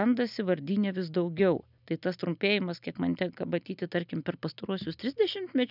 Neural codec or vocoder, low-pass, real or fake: none; 5.4 kHz; real